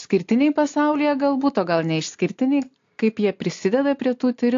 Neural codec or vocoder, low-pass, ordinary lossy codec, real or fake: none; 7.2 kHz; AAC, 48 kbps; real